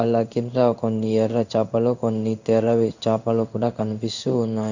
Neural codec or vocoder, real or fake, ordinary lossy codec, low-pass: codec, 16 kHz in and 24 kHz out, 1 kbps, XY-Tokenizer; fake; none; 7.2 kHz